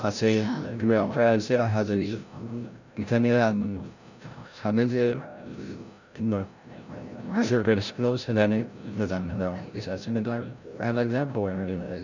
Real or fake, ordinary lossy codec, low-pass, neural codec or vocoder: fake; none; 7.2 kHz; codec, 16 kHz, 0.5 kbps, FreqCodec, larger model